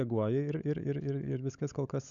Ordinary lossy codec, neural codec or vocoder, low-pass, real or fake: MP3, 96 kbps; codec, 16 kHz, 16 kbps, FunCodec, trained on LibriTTS, 50 frames a second; 7.2 kHz; fake